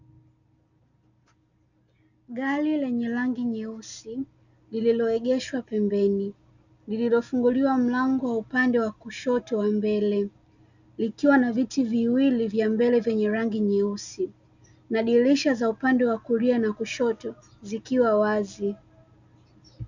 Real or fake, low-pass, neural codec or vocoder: real; 7.2 kHz; none